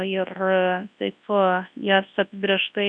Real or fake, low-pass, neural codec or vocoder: fake; 9.9 kHz; codec, 24 kHz, 0.9 kbps, WavTokenizer, large speech release